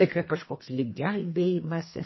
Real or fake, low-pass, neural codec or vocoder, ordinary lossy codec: fake; 7.2 kHz; codec, 32 kHz, 1.9 kbps, SNAC; MP3, 24 kbps